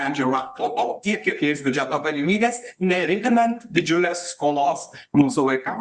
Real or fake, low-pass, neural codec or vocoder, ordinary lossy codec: fake; 10.8 kHz; codec, 24 kHz, 0.9 kbps, WavTokenizer, medium music audio release; Opus, 64 kbps